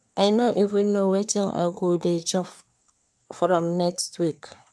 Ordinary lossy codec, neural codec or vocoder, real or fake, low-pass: none; codec, 24 kHz, 1 kbps, SNAC; fake; none